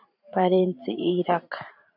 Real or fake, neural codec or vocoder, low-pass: fake; vocoder, 44.1 kHz, 128 mel bands every 512 samples, BigVGAN v2; 5.4 kHz